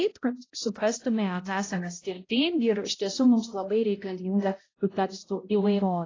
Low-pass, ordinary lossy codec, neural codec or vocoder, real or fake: 7.2 kHz; AAC, 32 kbps; codec, 16 kHz, 0.5 kbps, X-Codec, HuBERT features, trained on balanced general audio; fake